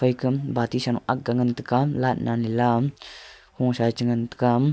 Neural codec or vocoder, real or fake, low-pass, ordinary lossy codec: none; real; none; none